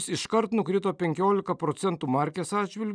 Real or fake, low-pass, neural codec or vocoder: real; 9.9 kHz; none